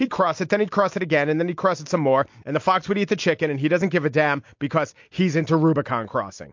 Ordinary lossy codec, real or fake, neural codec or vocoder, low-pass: MP3, 48 kbps; real; none; 7.2 kHz